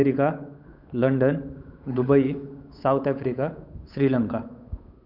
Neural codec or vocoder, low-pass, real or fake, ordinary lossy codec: codec, 16 kHz, 8 kbps, FunCodec, trained on Chinese and English, 25 frames a second; 5.4 kHz; fake; none